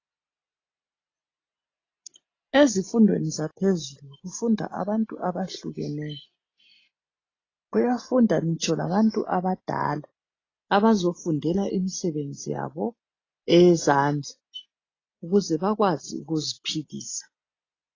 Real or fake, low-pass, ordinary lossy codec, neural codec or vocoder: real; 7.2 kHz; AAC, 32 kbps; none